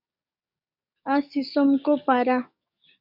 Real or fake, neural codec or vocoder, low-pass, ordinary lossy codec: fake; codec, 44.1 kHz, 7.8 kbps, DAC; 5.4 kHz; MP3, 48 kbps